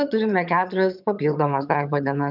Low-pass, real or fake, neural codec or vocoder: 5.4 kHz; fake; vocoder, 22.05 kHz, 80 mel bands, HiFi-GAN